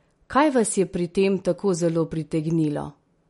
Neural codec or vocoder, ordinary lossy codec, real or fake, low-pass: none; MP3, 48 kbps; real; 19.8 kHz